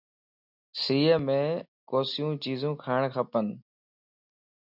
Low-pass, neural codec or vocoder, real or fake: 5.4 kHz; none; real